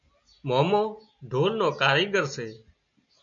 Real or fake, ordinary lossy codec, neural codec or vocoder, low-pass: real; MP3, 64 kbps; none; 7.2 kHz